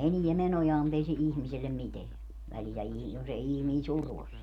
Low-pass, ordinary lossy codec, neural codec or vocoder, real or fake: 19.8 kHz; none; none; real